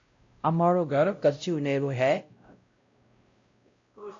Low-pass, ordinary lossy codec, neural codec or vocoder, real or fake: 7.2 kHz; AAC, 48 kbps; codec, 16 kHz, 0.5 kbps, X-Codec, WavLM features, trained on Multilingual LibriSpeech; fake